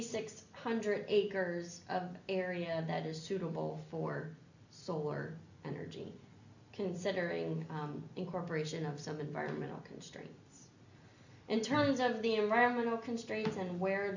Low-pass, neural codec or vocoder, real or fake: 7.2 kHz; none; real